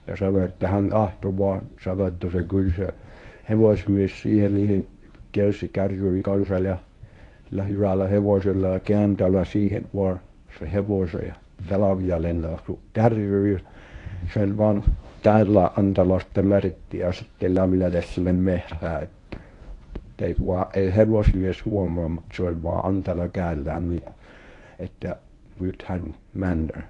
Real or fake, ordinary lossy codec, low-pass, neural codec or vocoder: fake; AAC, 48 kbps; 10.8 kHz; codec, 24 kHz, 0.9 kbps, WavTokenizer, medium speech release version 1